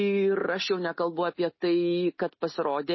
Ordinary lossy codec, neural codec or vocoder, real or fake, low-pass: MP3, 24 kbps; none; real; 7.2 kHz